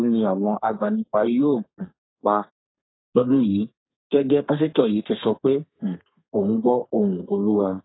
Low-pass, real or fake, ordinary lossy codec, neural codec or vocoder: 7.2 kHz; fake; AAC, 16 kbps; codec, 32 kHz, 1.9 kbps, SNAC